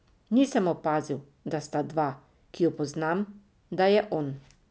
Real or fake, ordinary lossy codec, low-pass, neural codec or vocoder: real; none; none; none